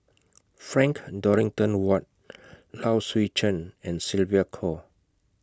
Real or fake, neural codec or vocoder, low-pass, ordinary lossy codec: real; none; none; none